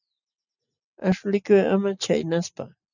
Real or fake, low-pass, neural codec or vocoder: real; 7.2 kHz; none